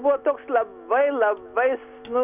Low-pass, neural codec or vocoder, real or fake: 3.6 kHz; none; real